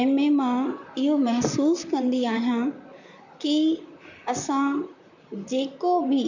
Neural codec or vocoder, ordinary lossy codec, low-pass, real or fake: vocoder, 44.1 kHz, 128 mel bands, Pupu-Vocoder; none; 7.2 kHz; fake